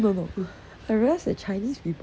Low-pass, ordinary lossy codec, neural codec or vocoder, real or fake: none; none; none; real